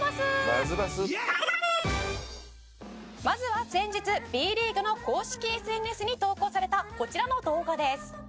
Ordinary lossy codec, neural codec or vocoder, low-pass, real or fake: none; none; none; real